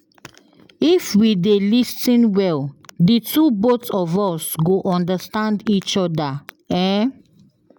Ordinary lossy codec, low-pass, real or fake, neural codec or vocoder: none; none; real; none